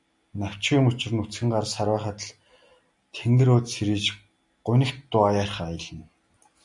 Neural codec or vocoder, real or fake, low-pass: none; real; 10.8 kHz